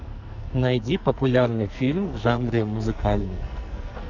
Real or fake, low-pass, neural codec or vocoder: fake; 7.2 kHz; codec, 44.1 kHz, 2.6 kbps, SNAC